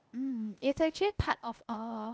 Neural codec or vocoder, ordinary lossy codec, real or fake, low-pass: codec, 16 kHz, 0.8 kbps, ZipCodec; none; fake; none